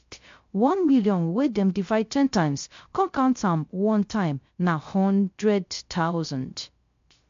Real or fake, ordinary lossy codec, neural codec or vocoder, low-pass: fake; MP3, 48 kbps; codec, 16 kHz, 0.3 kbps, FocalCodec; 7.2 kHz